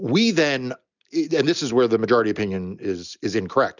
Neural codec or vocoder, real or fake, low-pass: none; real; 7.2 kHz